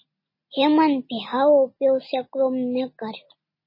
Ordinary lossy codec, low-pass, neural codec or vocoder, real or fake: MP3, 24 kbps; 5.4 kHz; none; real